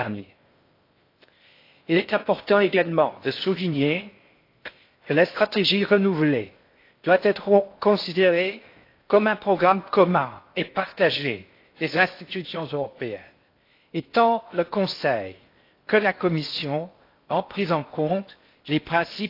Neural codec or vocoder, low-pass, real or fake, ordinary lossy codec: codec, 16 kHz in and 24 kHz out, 0.6 kbps, FocalCodec, streaming, 4096 codes; 5.4 kHz; fake; AAC, 32 kbps